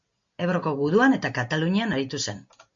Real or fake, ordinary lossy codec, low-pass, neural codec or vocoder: real; MP3, 48 kbps; 7.2 kHz; none